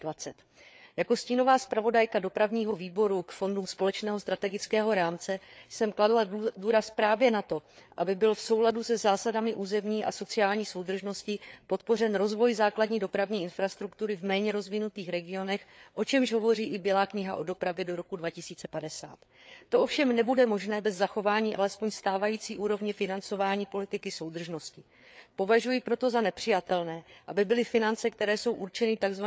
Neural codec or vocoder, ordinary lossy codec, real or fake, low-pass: codec, 16 kHz, 4 kbps, FreqCodec, larger model; none; fake; none